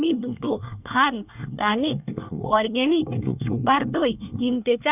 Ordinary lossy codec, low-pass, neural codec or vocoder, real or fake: none; 3.6 kHz; codec, 24 kHz, 1 kbps, SNAC; fake